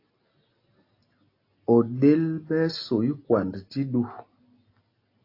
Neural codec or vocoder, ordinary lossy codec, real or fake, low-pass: none; AAC, 32 kbps; real; 5.4 kHz